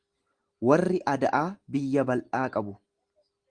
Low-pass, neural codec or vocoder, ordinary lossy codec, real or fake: 9.9 kHz; none; Opus, 24 kbps; real